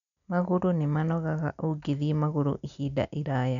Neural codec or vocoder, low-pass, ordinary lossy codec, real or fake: none; 7.2 kHz; none; real